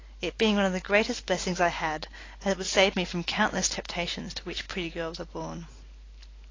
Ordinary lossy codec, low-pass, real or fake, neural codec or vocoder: AAC, 32 kbps; 7.2 kHz; real; none